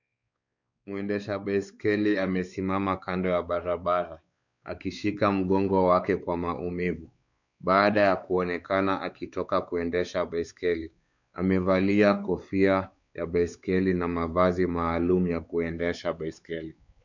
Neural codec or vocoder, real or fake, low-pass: codec, 16 kHz, 4 kbps, X-Codec, WavLM features, trained on Multilingual LibriSpeech; fake; 7.2 kHz